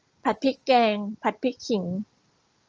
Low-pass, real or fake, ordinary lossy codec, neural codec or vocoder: 7.2 kHz; real; Opus, 24 kbps; none